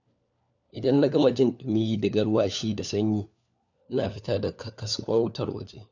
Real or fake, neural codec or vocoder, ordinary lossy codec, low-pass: fake; codec, 16 kHz, 4 kbps, FunCodec, trained on LibriTTS, 50 frames a second; none; 7.2 kHz